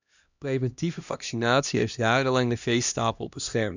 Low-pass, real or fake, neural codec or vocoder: 7.2 kHz; fake; codec, 16 kHz, 1 kbps, X-Codec, HuBERT features, trained on LibriSpeech